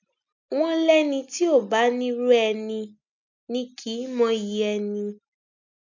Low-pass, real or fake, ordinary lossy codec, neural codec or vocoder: 7.2 kHz; real; none; none